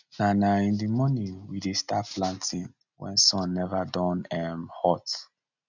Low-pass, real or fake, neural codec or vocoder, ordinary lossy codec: 7.2 kHz; real; none; none